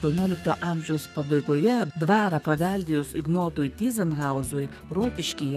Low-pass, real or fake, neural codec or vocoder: 14.4 kHz; fake; codec, 32 kHz, 1.9 kbps, SNAC